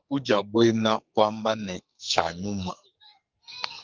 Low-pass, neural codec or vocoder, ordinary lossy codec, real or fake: 7.2 kHz; codec, 44.1 kHz, 2.6 kbps, SNAC; Opus, 32 kbps; fake